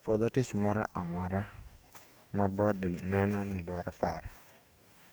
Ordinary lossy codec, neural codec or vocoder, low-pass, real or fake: none; codec, 44.1 kHz, 2.6 kbps, DAC; none; fake